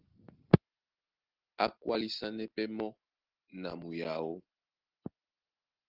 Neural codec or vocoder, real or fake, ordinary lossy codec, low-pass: none; real; Opus, 16 kbps; 5.4 kHz